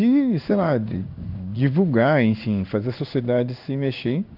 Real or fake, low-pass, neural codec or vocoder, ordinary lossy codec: fake; 5.4 kHz; codec, 16 kHz in and 24 kHz out, 1 kbps, XY-Tokenizer; none